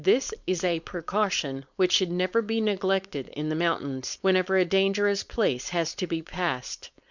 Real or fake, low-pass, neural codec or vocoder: fake; 7.2 kHz; codec, 16 kHz, 4.8 kbps, FACodec